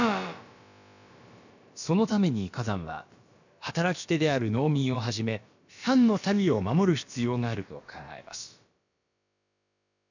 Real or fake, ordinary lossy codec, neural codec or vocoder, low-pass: fake; none; codec, 16 kHz, about 1 kbps, DyCAST, with the encoder's durations; 7.2 kHz